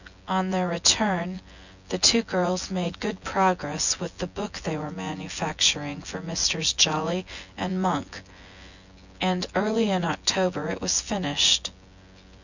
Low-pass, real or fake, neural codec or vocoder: 7.2 kHz; fake; vocoder, 24 kHz, 100 mel bands, Vocos